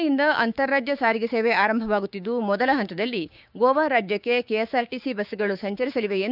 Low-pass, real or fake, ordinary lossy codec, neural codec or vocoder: 5.4 kHz; fake; none; codec, 16 kHz, 6 kbps, DAC